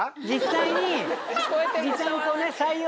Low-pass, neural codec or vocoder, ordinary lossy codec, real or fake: none; none; none; real